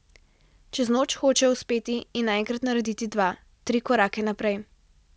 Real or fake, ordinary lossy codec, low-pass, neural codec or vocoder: real; none; none; none